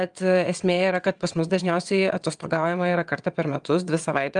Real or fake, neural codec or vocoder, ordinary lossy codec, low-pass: fake; vocoder, 22.05 kHz, 80 mel bands, WaveNeXt; Opus, 32 kbps; 9.9 kHz